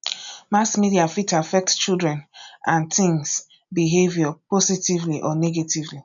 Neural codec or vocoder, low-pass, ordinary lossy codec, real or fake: none; 7.2 kHz; none; real